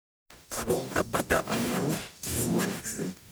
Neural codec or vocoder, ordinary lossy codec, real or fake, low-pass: codec, 44.1 kHz, 0.9 kbps, DAC; none; fake; none